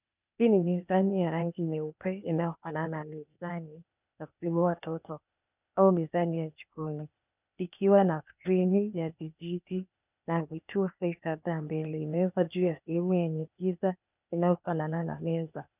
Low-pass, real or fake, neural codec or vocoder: 3.6 kHz; fake; codec, 16 kHz, 0.8 kbps, ZipCodec